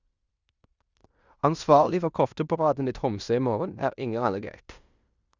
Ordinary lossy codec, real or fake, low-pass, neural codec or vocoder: Opus, 64 kbps; fake; 7.2 kHz; codec, 16 kHz in and 24 kHz out, 0.9 kbps, LongCat-Audio-Codec, fine tuned four codebook decoder